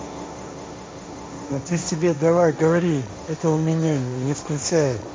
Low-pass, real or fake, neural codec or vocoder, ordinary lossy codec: none; fake; codec, 16 kHz, 1.1 kbps, Voila-Tokenizer; none